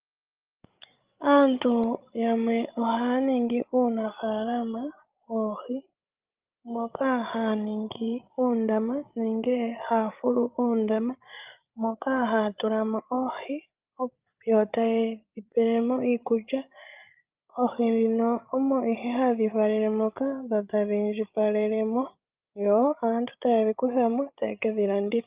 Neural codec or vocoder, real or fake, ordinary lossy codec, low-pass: codec, 16 kHz, 16 kbps, FreqCodec, larger model; fake; Opus, 24 kbps; 3.6 kHz